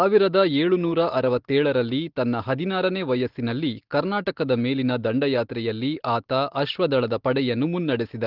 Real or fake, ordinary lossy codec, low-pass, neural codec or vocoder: real; Opus, 16 kbps; 5.4 kHz; none